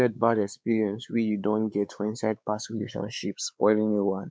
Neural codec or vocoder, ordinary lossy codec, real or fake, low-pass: codec, 16 kHz, 4 kbps, X-Codec, WavLM features, trained on Multilingual LibriSpeech; none; fake; none